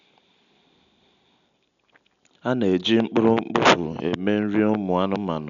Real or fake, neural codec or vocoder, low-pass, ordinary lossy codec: real; none; 7.2 kHz; none